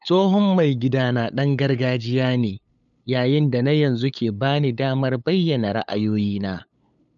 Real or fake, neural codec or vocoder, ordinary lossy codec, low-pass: fake; codec, 16 kHz, 8 kbps, FunCodec, trained on LibriTTS, 25 frames a second; none; 7.2 kHz